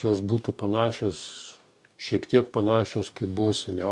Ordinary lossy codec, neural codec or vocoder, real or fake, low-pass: MP3, 64 kbps; codec, 44.1 kHz, 2.6 kbps, DAC; fake; 10.8 kHz